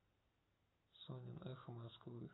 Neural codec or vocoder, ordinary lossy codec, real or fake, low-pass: none; AAC, 16 kbps; real; 7.2 kHz